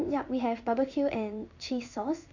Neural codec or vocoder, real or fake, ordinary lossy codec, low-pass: codec, 16 kHz in and 24 kHz out, 1 kbps, XY-Tokenizer; fake; none; 7.2 kHz